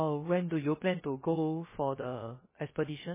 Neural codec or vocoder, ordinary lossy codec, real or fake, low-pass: codec, 16 kHz, 0.3 kbps, FocalCodec; MP3, 16 kbps; fake; 3.6 kHz